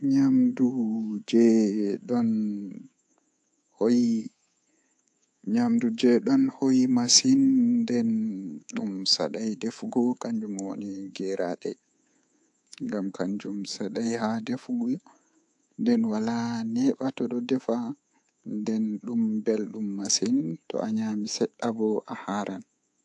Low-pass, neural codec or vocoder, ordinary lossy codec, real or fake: 10.8 kHz; codec, 24 kHz, 3.1 kbps, DualCodec; AAC, 64 kbps; fake